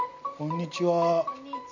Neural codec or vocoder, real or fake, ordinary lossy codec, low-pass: none; real; none; 7.2 kHz